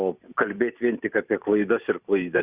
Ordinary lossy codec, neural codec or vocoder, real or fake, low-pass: Opus, 64 kbps; vocoder, 44.1 kHz, 128 mel bands every 256 samples, BigVGAN v2; fake; 3.6 kHz